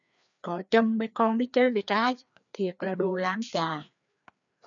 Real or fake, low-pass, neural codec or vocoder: fake; 7.2 kHz; codec, 16 kHz, 2 kbps, FreqCodec, larger model